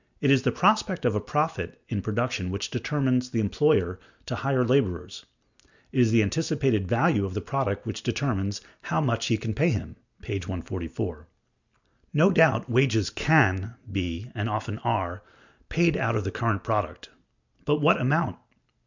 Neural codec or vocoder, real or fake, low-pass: none; real; 7.2 kHz